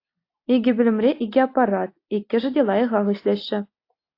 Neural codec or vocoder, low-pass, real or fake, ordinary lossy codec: none; 5.4 kHz; real; AAC, 32 kbps